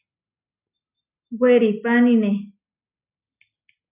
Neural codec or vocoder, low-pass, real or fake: none; 3.6 kHz; real